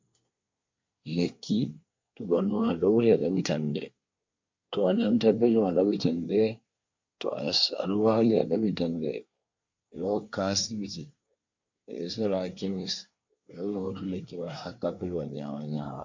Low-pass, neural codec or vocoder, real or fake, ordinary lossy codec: 7.2 kHz; codec, 24 kHz, 1 kbps, SNAC; fake; MP3, 48 kbps